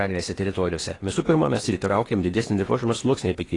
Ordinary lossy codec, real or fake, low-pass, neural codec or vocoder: AAC, 32 kbps; fake; 10.8 kHz; codec, 16 kHz in and 24 kHz out, 0.8 kbps, FocalCodec, streaming, 65536 codes